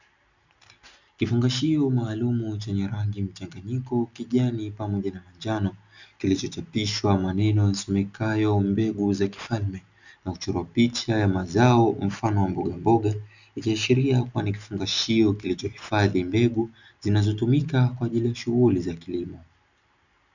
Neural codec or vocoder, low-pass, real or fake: none; 7.2 kHz; real